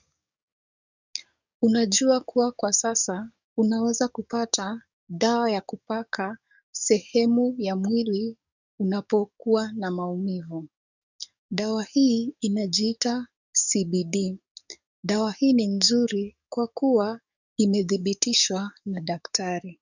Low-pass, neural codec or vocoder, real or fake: 7.2 kHz; codec, 44.1 kHz, 7.8 kbps, DAC; fake